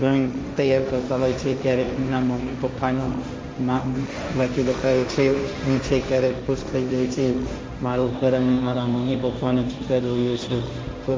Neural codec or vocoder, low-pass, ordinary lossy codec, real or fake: codec, 16 kHz, 1.1 kbps, Voila-Tokenizer; none; none; fake